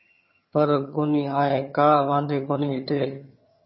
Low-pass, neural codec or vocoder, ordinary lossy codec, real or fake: 7.2 kHz; vocoder, 22.05 kHz, 80 mel bands, HiFi-GAN; MP3, 24 kbps; fake